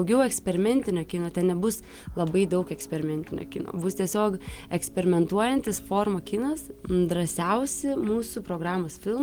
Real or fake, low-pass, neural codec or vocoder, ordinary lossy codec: real; 19.8 kHz; none; Opus, 24 kbps